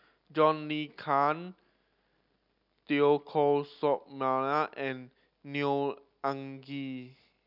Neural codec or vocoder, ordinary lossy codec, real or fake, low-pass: none; none; real; 5.4 kHz